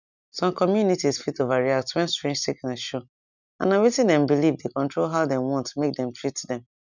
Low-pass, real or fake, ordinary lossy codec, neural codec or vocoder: 7.2 kHz; real; none; none